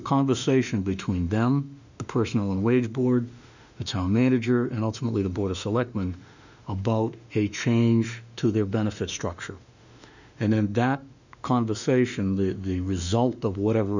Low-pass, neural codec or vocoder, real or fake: 7.2 kHz; autoencoder, 48 kHz, 32 numbers a frame, DAC-VAE, trained on Japanese speech; fake